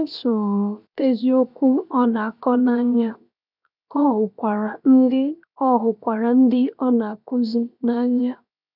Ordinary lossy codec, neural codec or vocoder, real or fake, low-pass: none; codec, 16 kHz, 0.7 kbps, FocalCodec; fake; 5.4 kHz